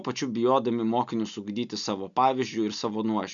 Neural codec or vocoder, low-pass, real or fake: none; 7.2 kHz; real